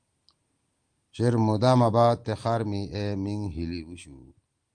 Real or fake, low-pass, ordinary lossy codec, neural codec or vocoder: real; 9.9 kHz; Opus, 24 kbps; none